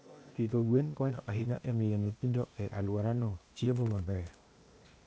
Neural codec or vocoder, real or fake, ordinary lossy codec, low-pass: codec, 16 kHz, 0.8 kbps, ZipCodec; fake; none; none